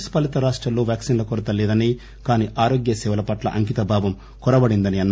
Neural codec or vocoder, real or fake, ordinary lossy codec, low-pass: none; real; none; none